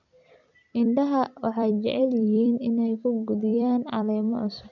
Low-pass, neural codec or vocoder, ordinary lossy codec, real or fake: 7.2 kHz; vocoder, 44.1 kHz, 128 mel bands every 256 samples, BigVGAN v2; none; fake